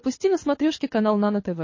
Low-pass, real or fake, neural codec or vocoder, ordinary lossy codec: 7.2 kHz; fake; codec, 24 kHz, 6 kbps, HILCodec; MP3, 32 kbps